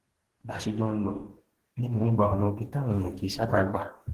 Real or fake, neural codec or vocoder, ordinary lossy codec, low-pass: fake; codec, 44.1 kHz, 2.6 kbps, DAC; Opus, 16 kbps; 14.4 kHz